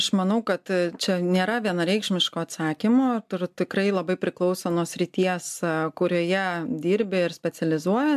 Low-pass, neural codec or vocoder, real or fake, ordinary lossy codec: 14.4 kHz; none; real; AAC, 96 kbps